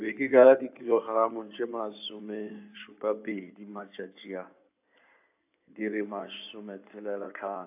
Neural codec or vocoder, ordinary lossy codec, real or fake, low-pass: codec, 16 kHz in and 24 kHz out, 2.2 kbps, FireRedTTS-2 codec; AAC, 32 kbps; fake; 3.6 kHz